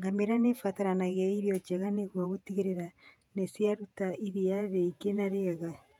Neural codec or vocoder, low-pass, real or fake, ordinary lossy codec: vocoder, 48 kHz, 128 mel bands, Vocos; 19.8 kHz; fake; none